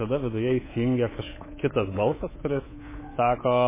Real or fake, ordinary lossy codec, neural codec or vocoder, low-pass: fake; MP3, 16 kbps; codec, 24 kHz, 3.1 kbps, DualCodec; 3.6 kHz